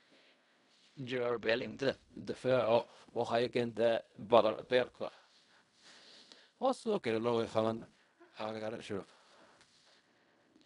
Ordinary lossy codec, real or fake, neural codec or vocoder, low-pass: none; fake; codec, 16 kHz in and 24 kHz out, 0.4 kbps, LongCat-Audio-Codec, fine tuned four codebook decoder; 10.8 kHz